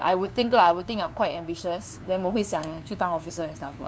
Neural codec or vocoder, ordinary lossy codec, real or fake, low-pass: codec, 16 kHz, 2 kbps, FunCodec, trained on LibriTTS, 25 frames a second; none; fake; none